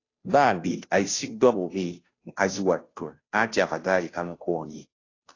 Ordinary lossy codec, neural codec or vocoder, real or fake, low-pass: AAC, 32 kbps; codec, 16 kHz, 0.5 kbps, FunCodec, trained on Chinese and English, 25 frames a second; fake; 7.2 kHz